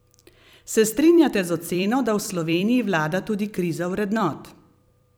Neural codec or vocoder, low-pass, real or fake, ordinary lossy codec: vocoder, 44.1 kHz, 128 mel bands every 256 samples, BigVGAN v2; none; fake; none